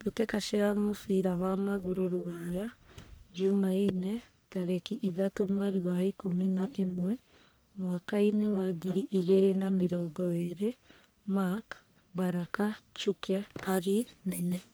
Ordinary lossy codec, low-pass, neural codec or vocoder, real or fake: none; none; codec, 44.1 kHz, 1.7 kbps, Pupu-Codec; fake